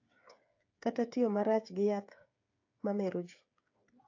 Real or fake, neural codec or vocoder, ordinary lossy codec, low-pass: fake; codec, 16 kHz, 8 kbps, FreqCodec, smaller model; AAC, 48 kbps; 7.2 kHz